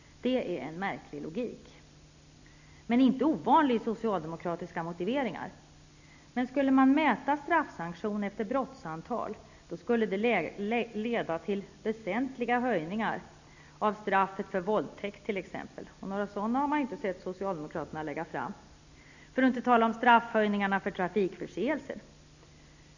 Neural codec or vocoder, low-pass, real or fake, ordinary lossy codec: none; 7.2 kHz; real; none